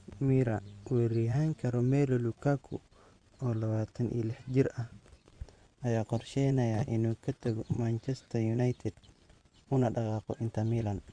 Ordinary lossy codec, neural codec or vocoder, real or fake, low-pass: Opus, 64 kbps; vocoder, 24 kHz, 100 mel bands, Vocos; fake; 9.9 kHz